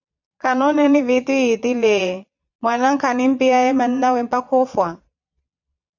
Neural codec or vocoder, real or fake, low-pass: vocoder, 22.05 kHz, 80 mel bands, Vocos; fake; 7.2 kHz